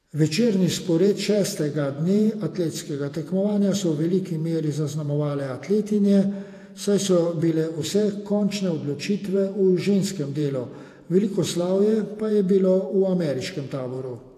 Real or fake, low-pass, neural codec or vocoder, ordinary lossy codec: fake; 14.4 kHz; vocoder, 48 kHz, 128 mel bands, Vocos; AAC, 64 kbps